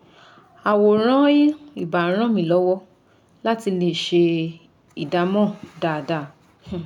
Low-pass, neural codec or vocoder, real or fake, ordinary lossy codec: 19.8 kHz; none; real; none